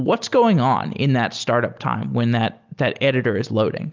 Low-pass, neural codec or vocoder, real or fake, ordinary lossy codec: 7.2 kHz; none; real; Opus, 24 kbps